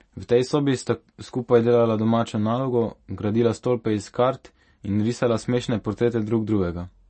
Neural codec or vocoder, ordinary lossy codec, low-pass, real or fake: none; MP3, 32 kbps; 10.8 kHz; real